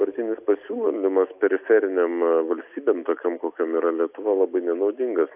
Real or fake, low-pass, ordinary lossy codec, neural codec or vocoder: real; 3.6 kHz; AAC, 32 kbps; none